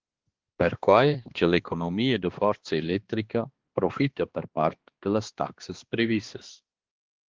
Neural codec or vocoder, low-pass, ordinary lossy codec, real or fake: codec, 16 kHz, 2 kbps, X-Codec, HuBERT features, trained on balanced general audio; 7.2 kHz; Opus, 16 kbps; fake